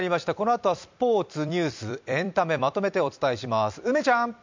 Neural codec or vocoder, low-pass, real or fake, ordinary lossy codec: vocoder, 44.1 kHz, 128 mel bands every 256 samples, BigVGAN v2; 7.2 kHz; fake; MP3, 64 kbps